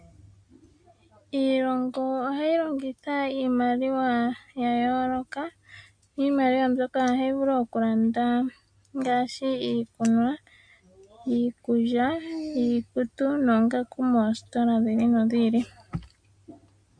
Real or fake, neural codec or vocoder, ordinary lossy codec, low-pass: real; none; MP3, 48 kbps; 9.9 kHz